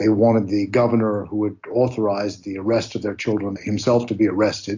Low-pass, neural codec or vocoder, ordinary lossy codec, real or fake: 7.2 kHz; none; AAC, 48 kbps; real